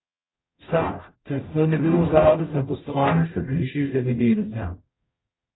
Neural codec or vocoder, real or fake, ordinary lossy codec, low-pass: codec, 44.1 kHz, 0.9 kbps, DAC; fake; AAC, 16 kbps; 7.2 kHz